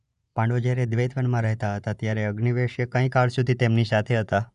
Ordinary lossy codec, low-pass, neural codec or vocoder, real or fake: none; 10.8 kHz; vocoder, 24 kHz, 100 mel bands, Vocos; fake